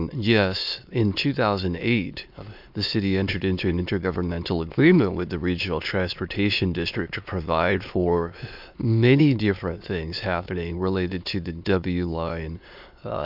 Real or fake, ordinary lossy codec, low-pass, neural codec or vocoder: fake; MP3, 48 kbps; 5.4 kHz; autoencoder, 22.05 kHz, a latent of 192 numbers a frame, VITS, trained on many speakers